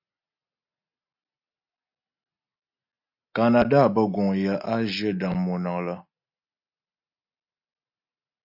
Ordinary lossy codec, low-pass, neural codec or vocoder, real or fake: AAC, 48 kbps; 5.4 kHz; none; real